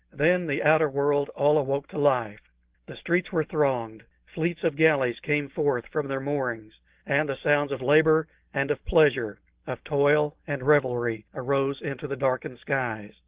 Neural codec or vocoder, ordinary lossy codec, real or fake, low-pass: none; Opus, 16 kbps; real; 3.6 kHz